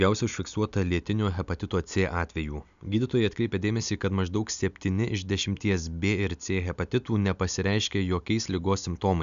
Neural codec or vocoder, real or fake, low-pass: none; real; 7.2 kHz